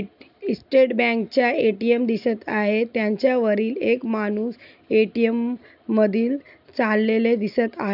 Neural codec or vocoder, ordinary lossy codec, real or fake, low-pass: none; none; real; 5.4 kHz